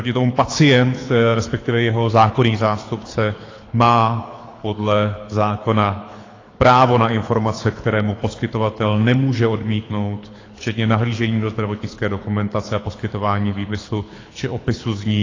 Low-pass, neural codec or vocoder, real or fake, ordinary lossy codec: 7.2 kHz; codec, 24 kHz, 6 kbps, HILCodec; fake; AAC, 32 kbps